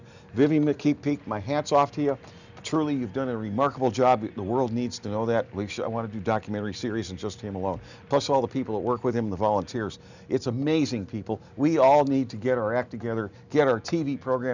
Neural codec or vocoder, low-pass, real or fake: none; 7.2 kHz; real